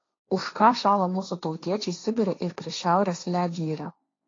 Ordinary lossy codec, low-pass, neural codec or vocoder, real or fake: AAC, 32 kbps; 7.2 kHz; codec, 16 kHz, 1.1 kbps, Voila-Tokenizer; fake